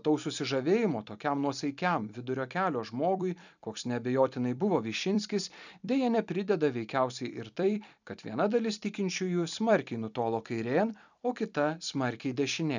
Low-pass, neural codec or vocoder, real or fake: 7.2 kHz; vocoder, 44.1 kHz, 128 mel bands every 256 samples, BigVGAN v2; fake